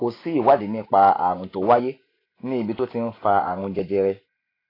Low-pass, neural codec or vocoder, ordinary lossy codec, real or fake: 5.4 kHz; none; AAC, 24 kbps; real